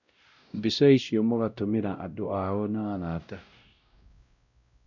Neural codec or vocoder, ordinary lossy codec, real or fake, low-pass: codec, 16 kHz, 0.5 kbps, X-Codec, WavLM features, trained on Multilingual LibriSpeech; none; fake; 7.2 kHz